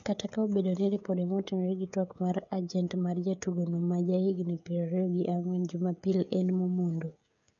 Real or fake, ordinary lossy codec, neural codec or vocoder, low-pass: fake; none; codec, 16 kHz, 16 kbps, FreqCodec, smaller model; 7.2 kHz